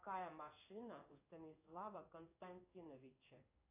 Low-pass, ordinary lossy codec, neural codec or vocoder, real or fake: 3.6 kHz; AAC, 32 kbps; codec, 16 kHz in and 24 kHz out, 1 kbps, XY-Tokenizer; fake